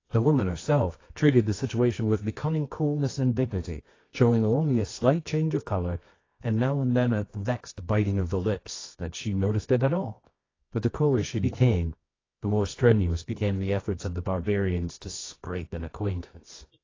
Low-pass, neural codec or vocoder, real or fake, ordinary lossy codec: 7.2 kHz; codec, 24 kHz, 0.9 kbps, WavTokenizer, medium music audio release; fake; AAC, 32 kbps